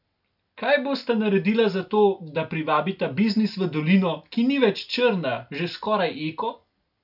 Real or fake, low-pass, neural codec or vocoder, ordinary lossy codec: real; 5.4 kHz; none; none